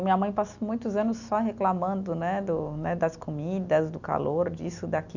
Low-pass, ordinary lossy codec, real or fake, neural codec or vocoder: 7.2 kHz; none; real; none